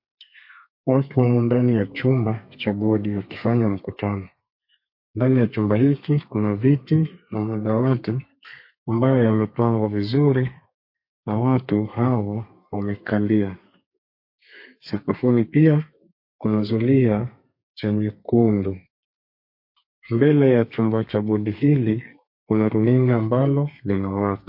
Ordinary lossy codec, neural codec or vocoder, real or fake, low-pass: MP3, 32 kbps; codec, 32 kHz, 1.9 kbps, SNAC; fake; 5.4 kHz